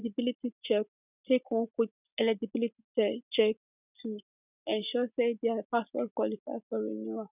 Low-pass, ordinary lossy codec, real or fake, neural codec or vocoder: 3.6 kHz; none; real; none